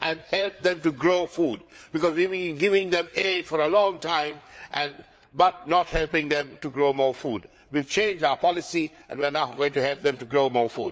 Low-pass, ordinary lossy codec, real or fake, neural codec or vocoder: none; none; fake; codec, 16 kHz, 4 kbps, FreqCodec, larger model